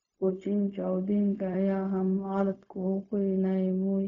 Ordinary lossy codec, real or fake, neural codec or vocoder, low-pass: none; fake; codec, 16 kHz, 0.4 kbps, LongCat-Audio-Codec; 7.2 kHz